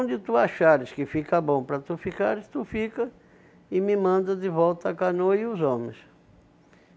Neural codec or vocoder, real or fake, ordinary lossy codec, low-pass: none; real; none; none